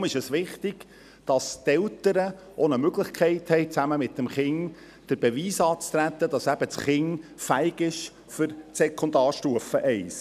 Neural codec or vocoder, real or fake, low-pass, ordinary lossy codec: none; real; 14.4 kHz; none